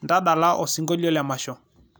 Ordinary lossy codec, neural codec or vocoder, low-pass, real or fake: none; none; none; real